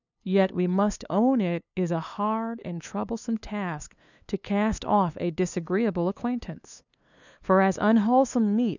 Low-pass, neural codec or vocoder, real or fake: 7.2 kHz; codec, 16 kHz, 2 kbps, FunCodec, trained on LibriTTS, 25 frames a second; fake